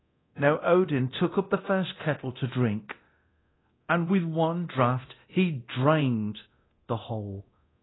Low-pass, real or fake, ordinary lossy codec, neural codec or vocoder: 7.2 kHz; fake; AAC, 16 kbps; codec, 24 kHz, 0.9 kbps, DualCodec